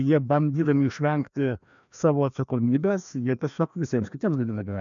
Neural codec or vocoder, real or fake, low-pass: codec, 16 kHz, 1 kbps, FreqCodec, larger model; fake; 7.2 kHz